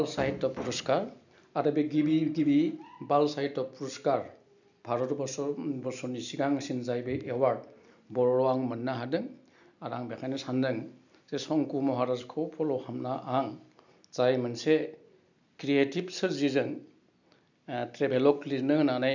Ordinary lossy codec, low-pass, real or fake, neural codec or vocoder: none; 7.2 kHz; real; none